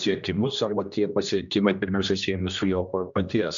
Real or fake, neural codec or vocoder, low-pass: fake; codec, 16 kHz, 1 kbps, X-Codec, HuBERT features, trained on general audio; 7.2 kHz